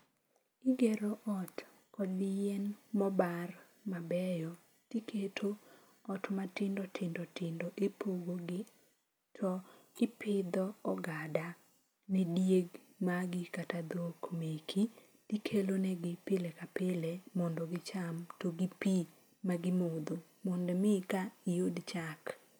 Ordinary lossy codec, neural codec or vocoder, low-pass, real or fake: none; none; none; real